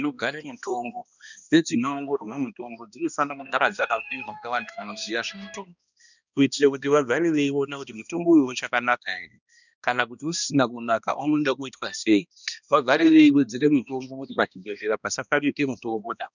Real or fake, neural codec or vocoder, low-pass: fake; codec, 16 kHz, 1 kbps, X-Codec, HuBERT features, trained on balanced general audio; 7.2 kHz